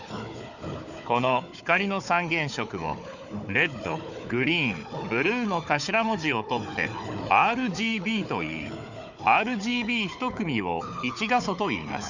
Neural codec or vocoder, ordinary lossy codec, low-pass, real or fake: codec, 16 kHz, 4 kbps, FunCodec, trained on Chinese and English, 50 frames a second; none; 7.2 kHz; fake